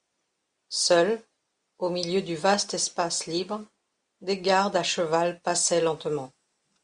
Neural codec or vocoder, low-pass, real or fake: none; 9.9 kHz; real